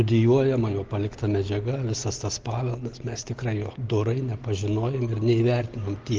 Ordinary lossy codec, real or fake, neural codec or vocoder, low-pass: Opus, 16 kbps; real; none; 7.2 kHz